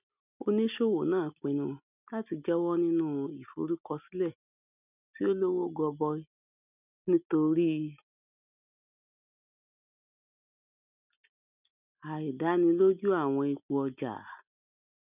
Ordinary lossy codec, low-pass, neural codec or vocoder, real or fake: none; 3.6 kHz; none; real